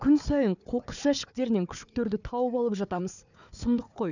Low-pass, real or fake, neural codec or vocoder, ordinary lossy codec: 7.2 kHz; fake; codec, 16 kHz, 4 kbps, FunCodec, trained on Chinese and English, 50 frames a second; none